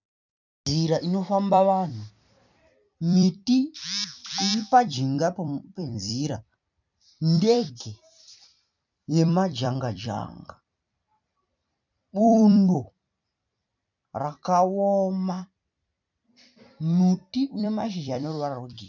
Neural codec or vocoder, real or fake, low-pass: vocoder, 44.1 kHz, 128 mel bands every 256 samples, BigVGAN v2; fake; 7.2 kHz